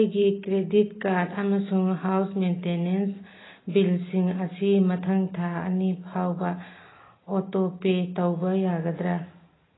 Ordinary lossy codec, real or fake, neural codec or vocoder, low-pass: AAC, 16 kbps; real; none; 7.2 kHz